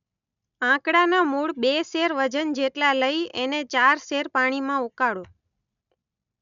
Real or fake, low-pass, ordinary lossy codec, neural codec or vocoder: real; 7.2 kHz; none; none